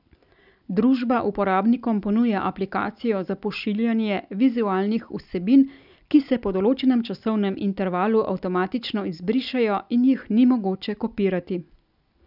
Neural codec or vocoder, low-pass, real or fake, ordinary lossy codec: none; 5.4 kHz; real; none